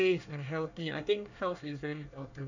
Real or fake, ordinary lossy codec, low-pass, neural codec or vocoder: fake; none; 7.2 kHz; codec, 24 kHz, 1 kbps, SNAC